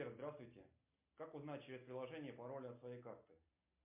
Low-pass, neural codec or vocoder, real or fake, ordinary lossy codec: 3.6 kHz; none; real; MP3, 32 kbps